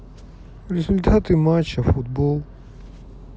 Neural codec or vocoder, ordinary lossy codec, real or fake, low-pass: none; none; real; none